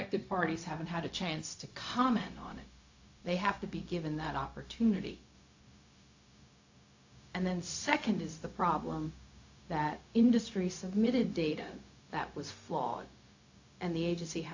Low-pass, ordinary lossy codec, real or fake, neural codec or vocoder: 7.2 kHz; AAC, 48 kbps; fake; codec, 16 kHz, 0.4 kbps, LongCat-Audio-Codec